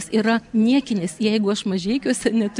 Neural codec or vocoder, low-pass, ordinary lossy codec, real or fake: none; 10.8 kHz; MP3, 96 kbps; real